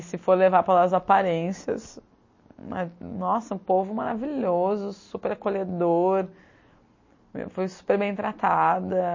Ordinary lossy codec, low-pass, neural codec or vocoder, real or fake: MP3, 32 kbps; 7.2 kHz; none; real